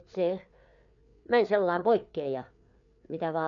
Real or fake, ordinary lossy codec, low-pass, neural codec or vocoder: fake; none; 7.2 kHz; codec, 16 kHz, 4 kbps, FreqCodec, larger model